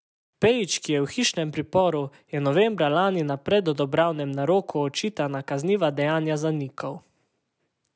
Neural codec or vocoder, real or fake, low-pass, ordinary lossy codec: none; real; none; none